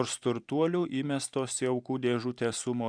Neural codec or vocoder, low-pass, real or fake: vocoder, 44.1 kHz, 128 mel bands every 512 samples, BigVGAN v2; 9.9 kHz; fake